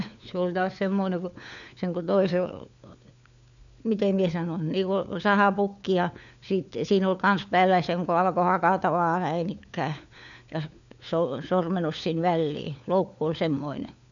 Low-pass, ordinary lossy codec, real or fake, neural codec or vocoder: 7.2 kHz; none; fake; codec, 16 kHz, 4 kbps, FunCodec, trained on LibriTTS, 50 frames a second